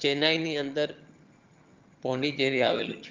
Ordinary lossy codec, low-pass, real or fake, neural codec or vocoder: Opus, 32 kbps; 7.2 kHz; fake; vocoder, 22.05 kHz, 80 mel bands, HiFi-GAN